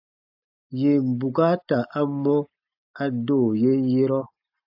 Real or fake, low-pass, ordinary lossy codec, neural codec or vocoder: real; 5.4 kHz; AAC, 48 kbps; none